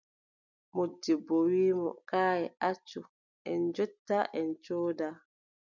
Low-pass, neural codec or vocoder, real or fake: 7.2 kHz; none; real